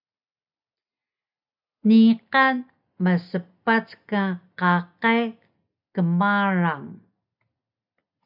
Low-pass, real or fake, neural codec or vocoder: 5.4 kHz; real; none